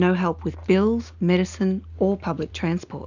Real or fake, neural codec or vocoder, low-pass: real; none; 7.2 kHz